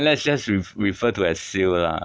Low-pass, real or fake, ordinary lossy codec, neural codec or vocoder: none; real; none; none